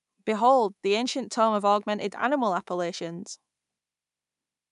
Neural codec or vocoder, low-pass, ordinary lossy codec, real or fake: codec, 24 kHz, 3.1 kbps, DualCodec; 10.8 kHz; none; fake